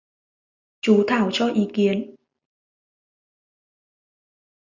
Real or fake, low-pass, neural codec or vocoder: real; 7.2 kHz; none